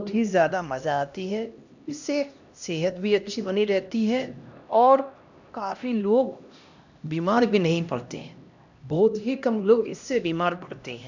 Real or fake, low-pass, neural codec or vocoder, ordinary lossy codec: fake; 7.2 kHz; codec, 16 kHz, 1 kbps, X-Codec, HuBERT features, trained on LibriSpeech; none